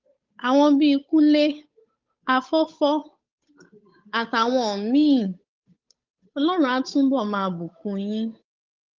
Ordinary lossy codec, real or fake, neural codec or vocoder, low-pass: Opus, 24 kbps; fake; codec, 16 kHz, 8 kbps, FunCodec, trained on Chinese and English, 25 frames a second; 7.2 kHz